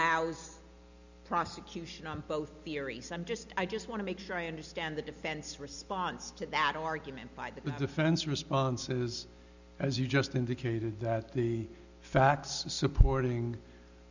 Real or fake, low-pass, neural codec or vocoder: real; 7.2 kHz; none